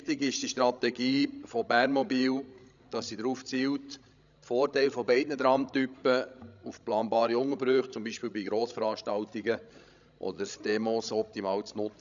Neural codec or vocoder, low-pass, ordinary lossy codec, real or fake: codec, 16 kHz, 16 kbps, FreqCodec, larger model; 7.2 kHz; none; fake